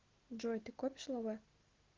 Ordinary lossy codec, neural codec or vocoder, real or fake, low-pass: Opus, 16 kbps; none; real; 7.2 kHz